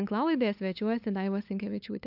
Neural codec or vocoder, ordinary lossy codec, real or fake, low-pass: codec, 16 kHz, 8 kbps, FunCodec, trained on LibriTTS, 25 frames a second; AAC, 48 kbps; fake; 5.4 kHz